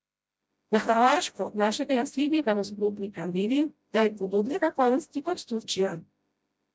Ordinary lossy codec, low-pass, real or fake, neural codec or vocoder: none; none; fake; codec, 16 kHz, 0.5 kbps, FreqCodec, smaller model